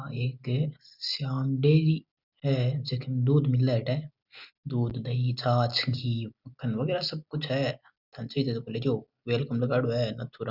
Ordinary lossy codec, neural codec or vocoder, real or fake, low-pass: Opus, 64 kbps; none; real; 5.4 kHz